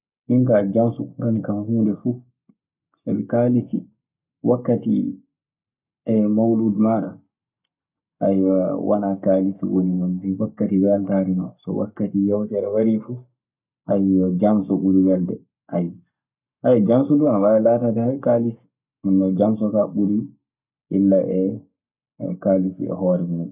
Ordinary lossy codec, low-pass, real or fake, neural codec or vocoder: none; 3.6 kHz; fake; codec, 44.1 kHz, 7.8 kbps, Pupu-Codec